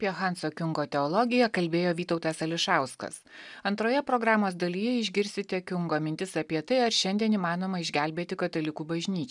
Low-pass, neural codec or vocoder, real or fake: 10.8 kHz; none; real